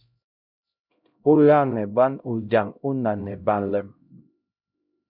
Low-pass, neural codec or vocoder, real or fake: 5.4 kHz; codec, 16 kHz, 0.5 kbps, X-Codec, HuBERT features, trained on LibriSpeech; fake